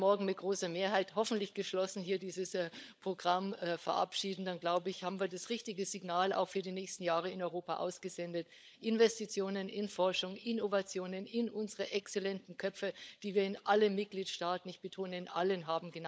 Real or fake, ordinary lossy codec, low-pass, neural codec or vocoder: fake; none; none; codec, 16 kHz, 16 kbps, FunCodec, trained on LibriTTS, 50 frames a second